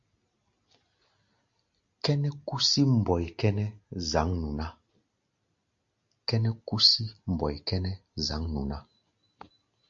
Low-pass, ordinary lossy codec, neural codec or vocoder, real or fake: 7.2 kHz; MP3, 48 kbps; none; real